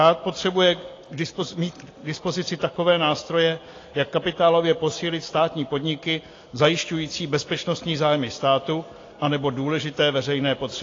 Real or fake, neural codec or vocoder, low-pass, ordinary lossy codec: real; none; 7.2 kHz; AAC, 32 kbps